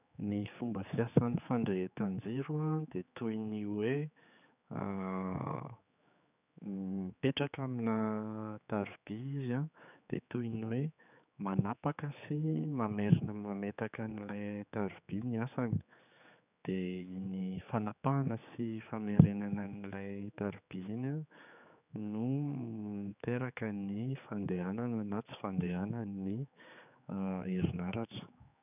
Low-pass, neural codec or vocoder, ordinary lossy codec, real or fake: 3.6 kHz; codec, 16 kHz, 4 kbps, X-Codec, HuBERT features, trained on general audio; none; fake